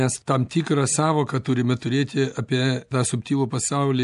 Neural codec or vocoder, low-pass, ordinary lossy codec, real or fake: none; 10.8 kHz; AAC, 96 kbps; real